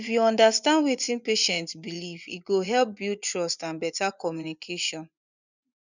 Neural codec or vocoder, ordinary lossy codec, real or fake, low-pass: vocoder, 24 kHz, 100 mel bands, Vocos; none; fake; 7.2 kHz